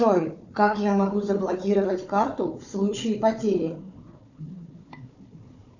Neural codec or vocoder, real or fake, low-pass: codec, 16 kHz, 4 kbps, FunCodec, trained on Chinese and English, 50 frames a second; fake; 7.2 kHz